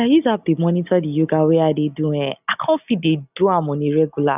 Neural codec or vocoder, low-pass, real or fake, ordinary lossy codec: none; 3.6 kHz; real; none